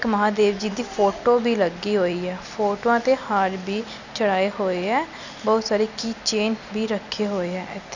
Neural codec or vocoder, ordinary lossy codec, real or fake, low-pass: none; none; real; 7.2 kHz